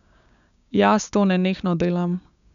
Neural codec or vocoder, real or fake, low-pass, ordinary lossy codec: codec, 16 kHz, 6 kbps, DAC; fake; 7.2 kHz; none